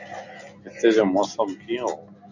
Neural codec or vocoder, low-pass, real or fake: none; 7.2 kHz; real